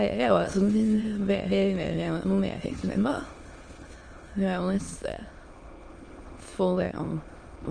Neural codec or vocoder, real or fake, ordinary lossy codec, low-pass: autoencoder, 22.05 kHz, a latent of 192 numbers a frame, VITS, trained on many speakers; fake; Opus, 24 kbps; 9.9 kHz